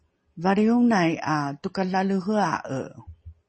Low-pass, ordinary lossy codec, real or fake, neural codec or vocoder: 10.8 kHz; MP3, 32 kbps; real; none